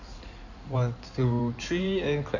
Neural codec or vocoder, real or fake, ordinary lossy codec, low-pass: codec, 16 kHz in and 24 kHz out, 2.2 kbps, FireRedTTS-2 codec; fake; none; 7.2 kHz